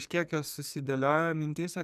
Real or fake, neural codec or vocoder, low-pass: fake; codec, 44.1 kHz, 2.6 kbps, SNAC; 14.4 kHz